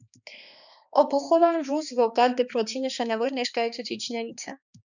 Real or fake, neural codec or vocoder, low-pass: fake; codec, 16 kHz, 2 kbps, X-Codec, HuBERT features, trained on balanced general audio; 7.2 kHz